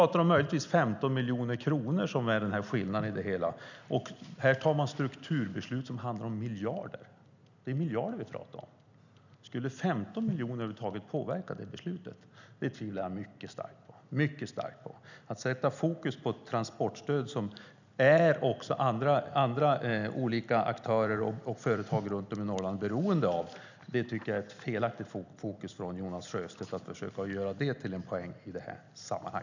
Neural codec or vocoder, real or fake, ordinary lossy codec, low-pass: none; real; none; 7.2 kHz